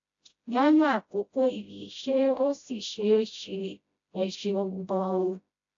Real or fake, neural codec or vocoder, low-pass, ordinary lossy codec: fake; codec, 16 kHz, 0.5 kbps, FreqCodec, smaller model; 7.2 kHz; AAC, 48 kbps